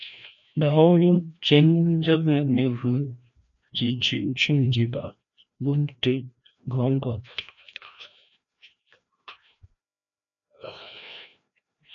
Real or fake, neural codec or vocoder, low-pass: fake; codec, 16 kHz, 1 kbps, FreqCodec, larger model; 7.2 kHz